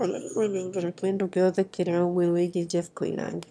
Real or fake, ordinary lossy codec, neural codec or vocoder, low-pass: fake; none; autoencoder, 22.05 kHz, a latent of 192 numbers a frame, VITS, trained on one speaker; none